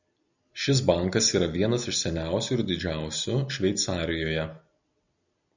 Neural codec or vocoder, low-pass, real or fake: none; 7.2 kHz; real